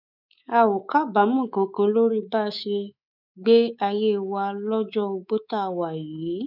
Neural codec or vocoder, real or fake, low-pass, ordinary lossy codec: codec, 24 kHz, 3.1 kbps, DualCodec; fake; 5.4 kHz; none